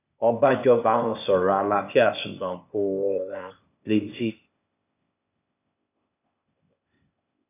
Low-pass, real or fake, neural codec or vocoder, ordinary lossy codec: 3.6 kHz; fake; codec, 16 kHz, 0.8 kbps, ZipCodec; none